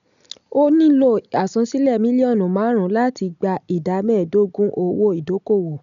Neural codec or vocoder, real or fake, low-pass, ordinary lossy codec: none; real; 7.2 kHz; none